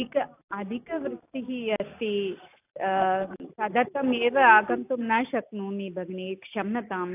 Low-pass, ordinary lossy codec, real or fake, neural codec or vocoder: 3.6 kHz; AAC, 32 kbps; real; none